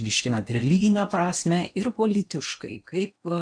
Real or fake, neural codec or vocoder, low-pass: fake; codec, 16 kHz in and 24 kHz out, 0.8 kbps, FocalCodec, streaming, 65536 codes; 9.9 kHz